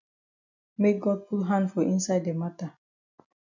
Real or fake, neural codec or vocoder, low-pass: real; none; 7.2 kHz